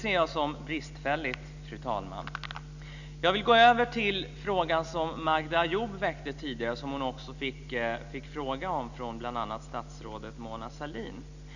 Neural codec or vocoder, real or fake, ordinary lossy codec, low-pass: none; real; none; 7.2 kHz